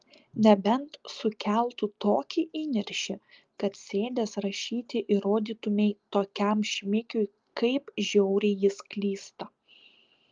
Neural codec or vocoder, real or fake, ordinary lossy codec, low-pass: none; real; Opus, 32 kbps; 7.2 kHz